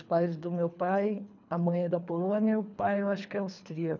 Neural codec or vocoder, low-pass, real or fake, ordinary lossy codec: codec, 24 kHz, 3 kbps, HILCodec; 7.2 kHz; fake; none